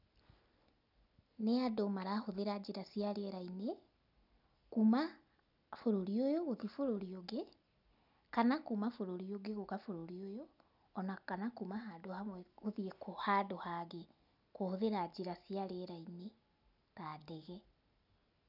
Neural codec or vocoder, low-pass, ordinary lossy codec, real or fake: none; 5.4 kHz; none; real